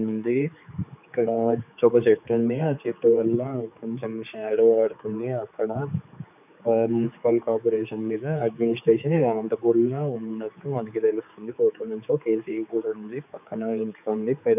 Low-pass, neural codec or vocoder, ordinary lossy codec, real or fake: 3.6 kHz; codec, 16 kHz, 4 kbps, X-Codec, HuBERT features, trained on general audio; none; fake